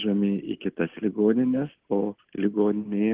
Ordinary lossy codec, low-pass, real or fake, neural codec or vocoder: Opus, 16 kbps; 3.6 kHz; real; none